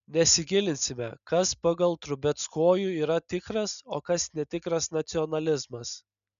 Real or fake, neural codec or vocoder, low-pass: real; none; 7.2 kHz